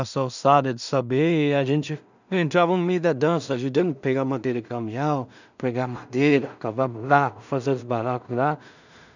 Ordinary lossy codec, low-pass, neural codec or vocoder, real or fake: none; 7.2 kHz; codec, 16 kHz in and 24 kHz out, 0.4 kbps, LongCat-Audio-Codec, two codebook decoder; fake